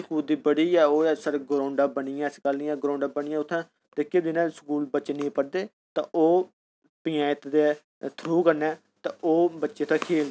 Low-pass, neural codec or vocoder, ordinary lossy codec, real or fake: none; none; none; real